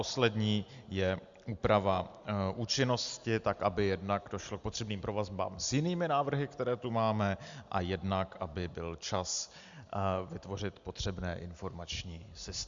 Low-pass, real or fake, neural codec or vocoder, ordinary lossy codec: 7.2 kHz; real; none; Opus, 64 kbps